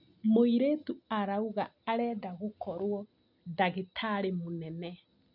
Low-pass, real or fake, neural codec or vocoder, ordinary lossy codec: 5.4 kHz; real; none; none